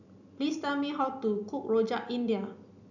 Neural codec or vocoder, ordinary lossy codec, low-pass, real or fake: none; none; 7.2 kHz; real